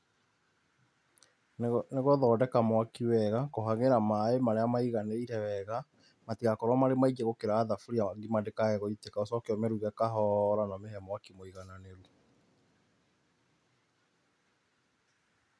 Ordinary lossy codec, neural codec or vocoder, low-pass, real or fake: none; none; none; real